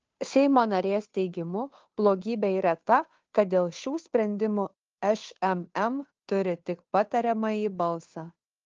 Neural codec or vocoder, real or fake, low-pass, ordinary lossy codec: codec, 16 kHz, 2 kbps, FunCodec, trained on Chinese and English, 25 frames a second; fake; 7.2 kHz; Opus, 24 kbps